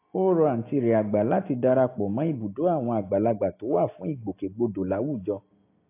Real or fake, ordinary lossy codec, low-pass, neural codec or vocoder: real; AAC, 24 kbps; 3.6 kHz; none